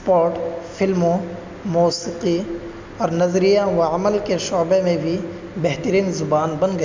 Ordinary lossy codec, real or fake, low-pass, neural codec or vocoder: MP3, 64 kbps; real; 7.2 kHz; none